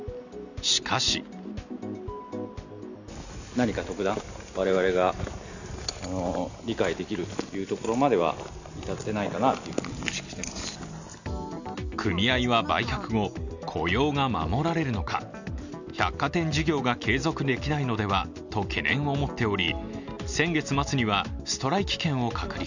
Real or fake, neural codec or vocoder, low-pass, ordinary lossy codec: real; none; 7.2 kHz; none